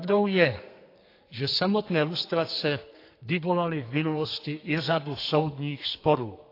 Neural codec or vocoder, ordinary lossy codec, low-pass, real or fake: codec, 32 kHz, 1.9 kbps, SNAC; AAC, 32 kbps; 5.4 kHz; fake